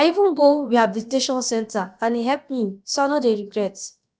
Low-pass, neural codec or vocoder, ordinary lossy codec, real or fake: none; codec, 16 kHz, about 1 kbps, DyCAST, with the encoder's durations; none; fake